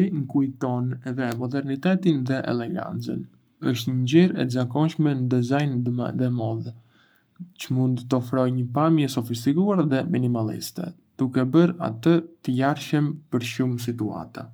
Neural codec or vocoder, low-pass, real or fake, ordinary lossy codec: codec, 44.1 kHz, 7.8 kbps, Pupu-Codec; none; fake; none